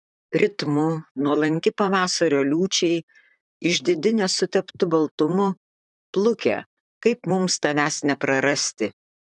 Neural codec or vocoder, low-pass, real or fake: vocoder, 44.1 kHz, 128 mel bands, Pupu-Vocoder; 10.8 kHz; fake